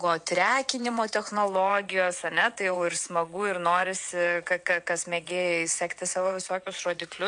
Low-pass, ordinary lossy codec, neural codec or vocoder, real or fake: 9.9 kHz; MP3, 64 kbps; vocoder, 22.05 kHz, 80 mel bands, WaveNeXt; fake